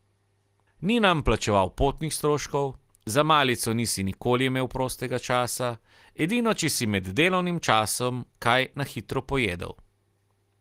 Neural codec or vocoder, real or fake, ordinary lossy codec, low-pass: none; real; Opus, 24 kbps; 14.4 kHz